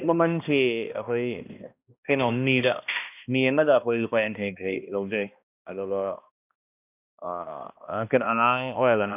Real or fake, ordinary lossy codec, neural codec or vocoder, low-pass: fake; none; codec, 16 kHz, 1 kbps, X-Codec, HuBERT features, trained on balanced general audio; 3.6 kHz